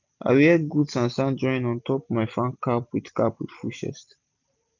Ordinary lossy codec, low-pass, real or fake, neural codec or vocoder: none; 7.2 kHz; real; none